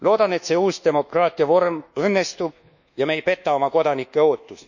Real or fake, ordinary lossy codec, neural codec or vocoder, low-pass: fake; none; codec, 24 kHz, 1.2 kbps, DualCodec; 7.2 kHz